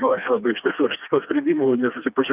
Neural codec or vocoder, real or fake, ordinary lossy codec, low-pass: codec, 16 kHz, 2 kbps, FreqCodec, smaller model; fake; Opus, 24 kbps; 3.6 kHz